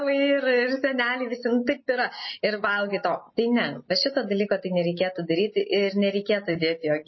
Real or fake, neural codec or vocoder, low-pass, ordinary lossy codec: real; none; 7.2 kHz; MP3, 24 kbps